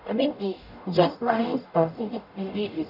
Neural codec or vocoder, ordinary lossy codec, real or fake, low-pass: codec, 44.1 kHz, 0.9 kbps, DAC; none; fake; 5.4 kHz